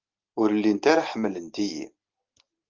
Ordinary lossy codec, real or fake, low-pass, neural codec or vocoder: Opus, 24 kbps; real; 7.2 kHz; none